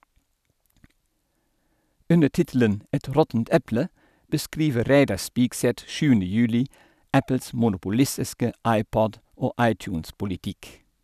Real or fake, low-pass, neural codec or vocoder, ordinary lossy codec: real; 14.4 kHz; none; none